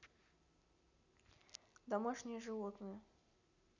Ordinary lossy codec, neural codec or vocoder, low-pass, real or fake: none; none; 7.2 kHz; real